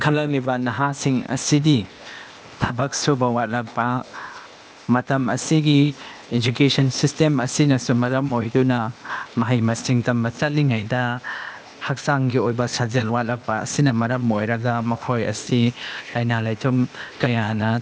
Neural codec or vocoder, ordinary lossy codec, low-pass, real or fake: codec, 16 kHz, 0.8 kbps, ZipCodec; none; none; fake